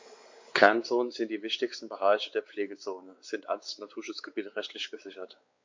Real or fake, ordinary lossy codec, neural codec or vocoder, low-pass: fake; MP3, 48 kbps; codec, 16 kHz, 4 kbps, X-Codec, WavLM features, trained on Multilingual LibriSpeech; 7.2 kHz